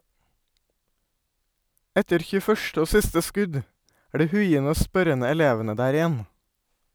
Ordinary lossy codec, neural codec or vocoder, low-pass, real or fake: none; vocoder, 44.1 kHz, 128 mel bands every 256 samples, BigVGAN v2; none; fake